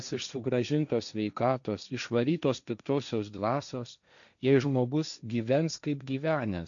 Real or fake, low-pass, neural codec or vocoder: fake; 7.2 kHz; codec, 16 kHz, 1.1 kbps, Voila-Tokenizer